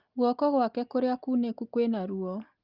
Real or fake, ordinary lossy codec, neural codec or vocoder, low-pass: real; Opus, 32 kbps; none; 5.4 kHz